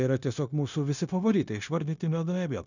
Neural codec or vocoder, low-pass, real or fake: codec, 16 kHz, 0.9 kbps, LongCat-Audio-Codec; 7.2 kHz; fake